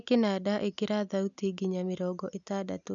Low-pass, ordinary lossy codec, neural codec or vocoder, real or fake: 7.2 kHz; none; none; real